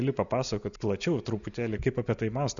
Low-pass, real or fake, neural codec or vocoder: 7.2 kHz; real; none